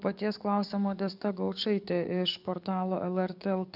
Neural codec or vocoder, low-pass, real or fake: codec, 44.1 kHz, 7.8 kbps, DAC; 5.4 kHz; fake